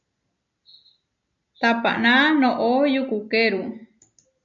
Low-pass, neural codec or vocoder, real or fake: 7.2 kHz; none; real